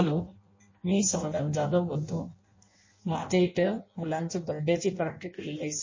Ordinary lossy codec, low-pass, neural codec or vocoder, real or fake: MP3, 32 kbps; 7.2 kHz; codec, 16 kHz in and 24 kHz out, 0.6 kbps, FireRedTTS-2 codec; fake